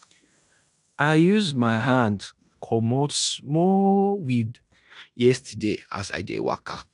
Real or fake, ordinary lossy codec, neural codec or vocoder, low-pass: fake; none; codec, 16 kHz in and 24 kHz out, 0.9 kbps, LongCat-Audio-Codec, fine tuned four codebook decoder; 10.8 kHz